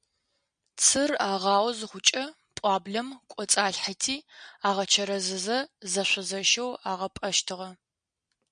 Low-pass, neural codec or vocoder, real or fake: 9.9 kHz; none; real